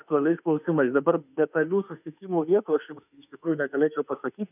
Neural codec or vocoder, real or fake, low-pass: autoencoder, 48 kHz, 32 numbers a frame, DAC-VAE, trained on Japanese speech; fake; 3.6 kHz